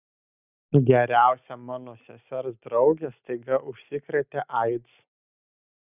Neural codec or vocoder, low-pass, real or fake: none; 3.6 kHz; real